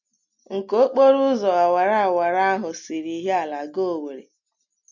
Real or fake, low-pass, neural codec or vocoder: real; 7.2 kHz; none